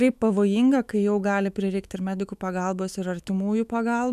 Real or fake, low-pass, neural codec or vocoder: fake; 14.4 kHz; autoencoder, 48 kHz, 128 numbers a frame, DAC-VAE, trained on Japanese speech